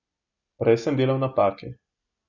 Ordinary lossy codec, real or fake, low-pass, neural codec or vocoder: none; real; 7.2 kHz; none